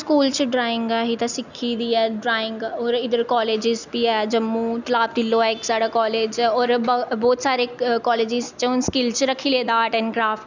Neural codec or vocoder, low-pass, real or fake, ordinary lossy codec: none; 7.2 kHz; real; none